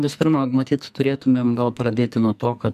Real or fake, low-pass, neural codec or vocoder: fake; 14.4 kHz; codec, 44.1 kHz, 2.6 kbps, SNAC